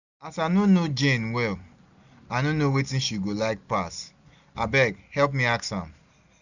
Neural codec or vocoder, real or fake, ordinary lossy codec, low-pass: none; real; none; 7.2 kHz